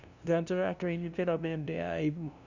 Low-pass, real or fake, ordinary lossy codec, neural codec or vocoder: 7.2 kHz; fake; none; codec, 16 kHz, 0.5 kbps, FunCodec, trained on LibriTTS, 25 frames a second